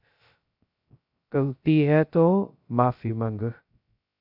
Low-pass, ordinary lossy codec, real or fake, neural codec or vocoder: 5.4 kHz; AAC, 48 kbps; fake; codec, 16 kHz, 0.2 kbps, FocalCodec